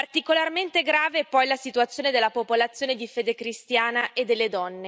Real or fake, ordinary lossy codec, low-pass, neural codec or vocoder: real; none; none; none